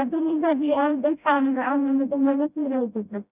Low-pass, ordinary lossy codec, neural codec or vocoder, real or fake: 3.6 kHz; none; codec, 16 kHz, 0.5 kbps, FreqCodec, smaller model; fake